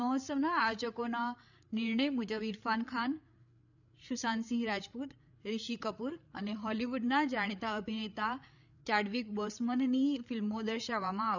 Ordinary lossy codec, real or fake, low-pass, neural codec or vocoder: none; fake; 7.2 kHz; codec, 16 kHz, 8 kbps, FreqCodec, larger model